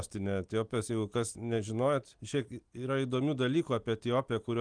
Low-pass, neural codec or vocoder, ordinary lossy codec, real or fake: 9.9 kHz; none; Opus, 24 kbps; real